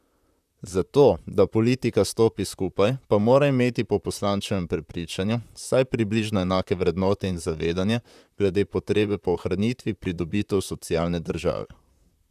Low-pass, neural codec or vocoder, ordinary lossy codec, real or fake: 14.4 kHz; vocoder, 44.1 kHz, 128 mel bands, Pupu-Vocoder; none; fake